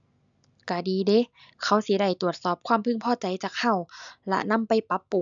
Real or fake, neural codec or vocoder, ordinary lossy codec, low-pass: real; none; MP3, 96 kbps; 7.2 kHz